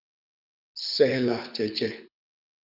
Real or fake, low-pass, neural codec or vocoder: fake; 5.4 kHz; codec, 24 kHz, 6 kbps, HILCodec